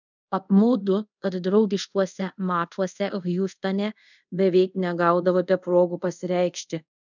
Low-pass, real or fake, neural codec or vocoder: 7.2 kHz; fake; codec, 24 kHz, 0.5 kbps, DualCodec